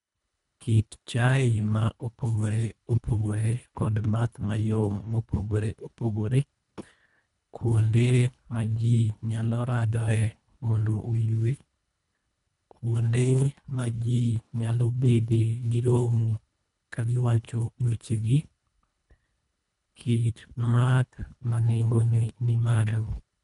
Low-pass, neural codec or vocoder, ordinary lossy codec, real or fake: 10.8 kHz; codec, 24 kHz, 1.5 kbps, HILCodec; none; fake